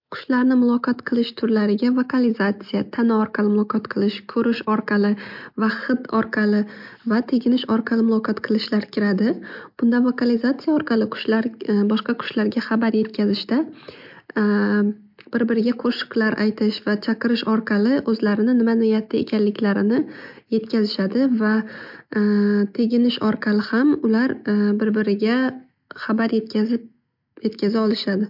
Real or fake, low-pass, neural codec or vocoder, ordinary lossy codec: real; 5.4 kHz; none; MP3, 48 kbps